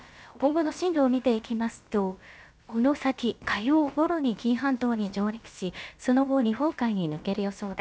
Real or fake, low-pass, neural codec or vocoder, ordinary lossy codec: fake; none; codec, 16 kHz, about 1 kbps, DyCAST, with the encoder's durations; none